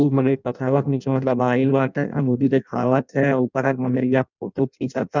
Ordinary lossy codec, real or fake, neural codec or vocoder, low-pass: none; fake; codec, 16 kHz in and 24 kHz out, 0.6 kbps, FireRedTTS-2 codec; 7.2 kHz